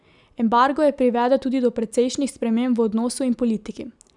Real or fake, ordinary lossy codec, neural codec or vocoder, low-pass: real; none; none; 10.8 kHz